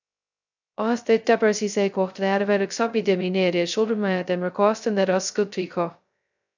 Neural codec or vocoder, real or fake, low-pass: codec, 16 kHz, 0.2 kbps, FocalCodec; fake; 7.2 kHz